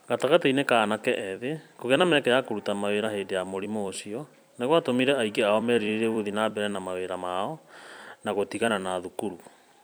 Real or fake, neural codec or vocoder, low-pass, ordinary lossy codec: fake; vocoder, 44.1 kHz, 128 mel bands every 256 samples, BigVGAN v2; none; none